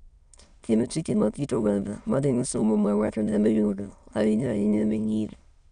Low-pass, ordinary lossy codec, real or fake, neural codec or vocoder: 9.9 kHz; none; fake; autoencoder, 22.05 kHz, a latent of 192 numbers a frame, VITS, trained on many speakers